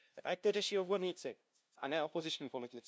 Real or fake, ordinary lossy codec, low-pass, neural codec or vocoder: fake; none; none; codec, 16 kHz, 0.5 kbps, FunCodec, trained on LibriTTS, 25 frames a second